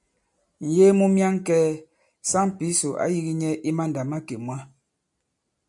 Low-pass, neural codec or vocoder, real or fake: 10.8 kHz; none; real